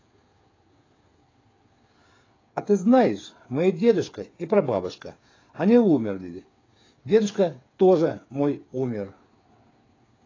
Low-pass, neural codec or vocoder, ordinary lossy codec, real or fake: 7.2 kHz; codec, 16 kHz, 8 kbps, FreqCodec, smaller model; AAC, 32 kbps; fake